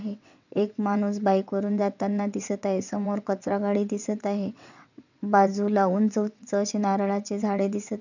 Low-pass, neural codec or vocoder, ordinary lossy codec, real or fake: 7.2 kHz; vocoder, 22.05 kHz, 80 mel bands, WaveNeXt; none; fake